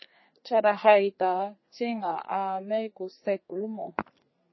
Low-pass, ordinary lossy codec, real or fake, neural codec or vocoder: 7.2 kHz; MP3, 24 kbps; fake; codec, 32 kHz, 1.9 kbps, SNAC